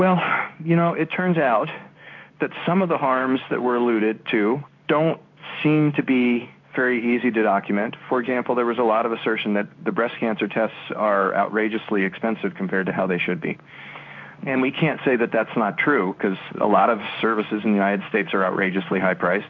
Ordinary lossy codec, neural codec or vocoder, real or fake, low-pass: MP3, 64 kbps; codec, 16 kHz in and 24 kHz out, 1 kbps, XY-Tokenizer; fake; 7.2 kHz